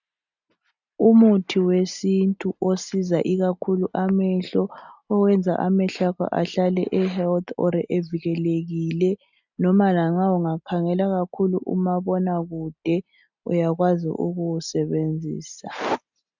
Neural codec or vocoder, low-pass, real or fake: none; 7.2 kHz; real